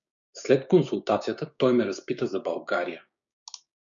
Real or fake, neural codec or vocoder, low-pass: fake; codec, 16 kHz, 6 kbps, DAC; 7.2 kHz